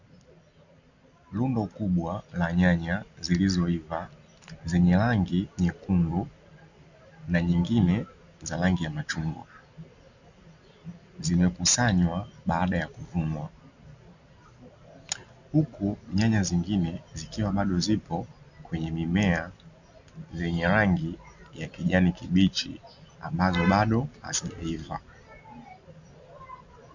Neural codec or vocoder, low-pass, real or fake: none; 7.2 kHz; real